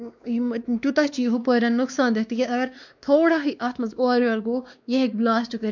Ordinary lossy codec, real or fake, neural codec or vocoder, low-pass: none; fake; codec, 16 kHz, 2 kbps, X-Codec, WavLM features, trained on Multilingual LibriSpeech; 7.2 kHz